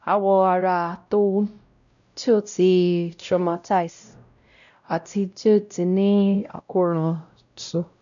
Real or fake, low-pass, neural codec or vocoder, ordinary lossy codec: fake; 7.2 kHz; codec, 16 kHz, 0.5 kbps, X-Codec, WavLM features, trained on Multilingual LibriSpeech; none